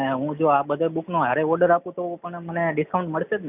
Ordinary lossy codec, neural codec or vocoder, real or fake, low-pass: none; none; real; 3.6 kHz